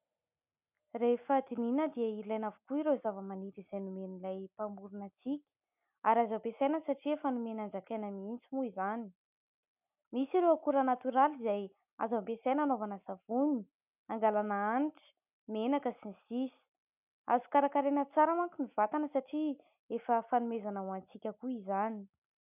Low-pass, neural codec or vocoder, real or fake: 3.6 kHz; none; real